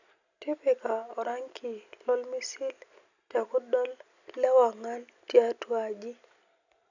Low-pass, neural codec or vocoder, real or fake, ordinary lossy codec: 7.2 kHz; none; real; none